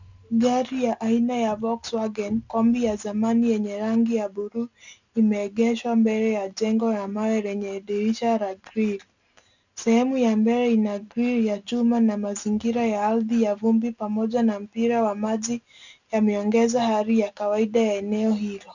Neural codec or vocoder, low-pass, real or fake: none; 7.2 kHz; real